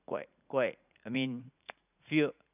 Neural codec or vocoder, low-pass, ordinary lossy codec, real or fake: vocoder, 44.1 kHz, 80 mel bands, Vocos; 3.6 kHz; none; fake